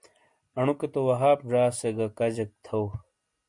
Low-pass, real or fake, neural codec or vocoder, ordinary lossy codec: 10.8 kHz; real; none; MP3, 64 kbps